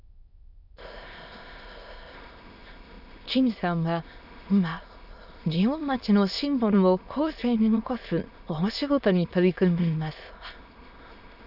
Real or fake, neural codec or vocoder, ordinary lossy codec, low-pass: fake; autoencoder, 22.05 kHz, a latent of 192 numbers a frame, VITS, trained on many speakers; none; 5.4 kHz